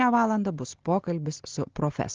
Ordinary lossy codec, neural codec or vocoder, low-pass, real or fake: Opus, 16 kbps; none; 7.2 kHz; real